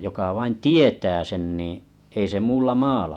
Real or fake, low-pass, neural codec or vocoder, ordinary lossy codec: fake; 19.8 kHz; vocoder, 48 kHz, 128 mel bands, Vocos; none